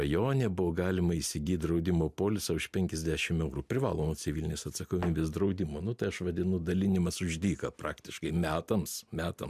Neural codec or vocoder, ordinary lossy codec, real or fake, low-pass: none; MP3, 96 kbps; real; 14.4 kHz